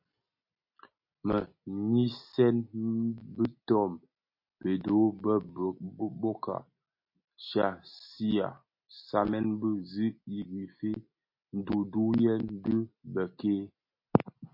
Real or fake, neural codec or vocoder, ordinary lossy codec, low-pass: real; none; MP3, 32 kbps; 5.4 kHz